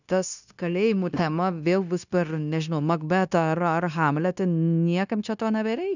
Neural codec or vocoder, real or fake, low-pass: codec, 16 kHz, 0.9 kbps, LongCat-Audio-Codec; fake; 7.2 kHz